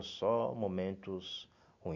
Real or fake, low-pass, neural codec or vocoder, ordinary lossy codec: real; 7.2 kHz; none; none